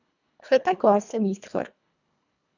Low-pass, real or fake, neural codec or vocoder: 7.2 kHz; fake; codec, 24 kHz, 1.5 kbps, HILCodec